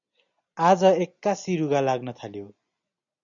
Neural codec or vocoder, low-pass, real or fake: none; 7.2 kHz; real